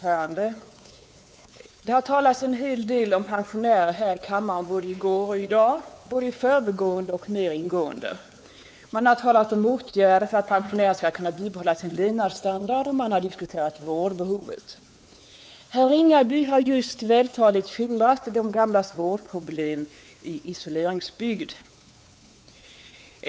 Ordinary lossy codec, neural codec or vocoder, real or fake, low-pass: none; codec, 16 kHz, 4 kbps, X-Codec, WavLM features, trained on Multilingual LibriSpeech; fake; none